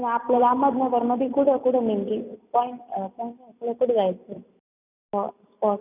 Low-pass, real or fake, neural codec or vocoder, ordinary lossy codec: 3.6 kHz; real; none; none